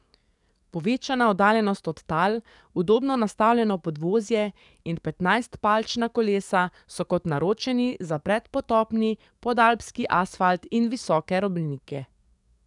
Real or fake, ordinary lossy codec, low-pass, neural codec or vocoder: fake; none; 10.8 kHz; codec, 44.1 kHz, 7.8 kbps, DAC